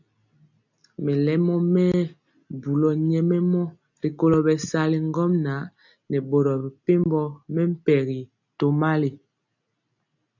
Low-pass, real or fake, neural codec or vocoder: 7.2 kHz; real; none